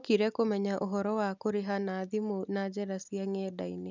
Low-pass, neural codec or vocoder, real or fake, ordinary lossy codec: 7.2 kHz; none; real; none